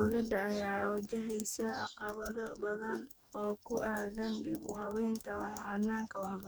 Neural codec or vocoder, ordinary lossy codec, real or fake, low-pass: codec, 44.1 kHz, 2.6 kbps, DAC; none; fake; none